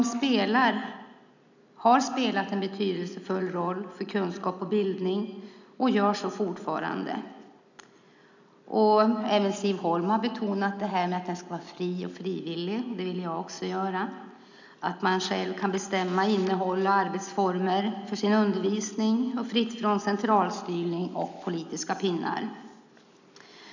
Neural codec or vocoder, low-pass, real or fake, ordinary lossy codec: none; 7.2 kHz; real; none